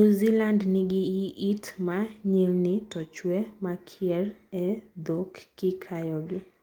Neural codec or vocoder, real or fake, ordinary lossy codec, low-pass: none; real; Opus, 24 kbps; 19.8 kHz